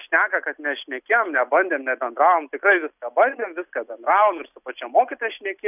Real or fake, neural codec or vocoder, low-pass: real; none; 3.6 kHz